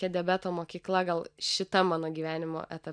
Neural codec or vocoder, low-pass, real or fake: none; 9.9 kHz; real